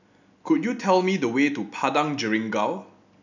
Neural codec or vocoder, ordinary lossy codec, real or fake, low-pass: none; none; real; 7.2 kHz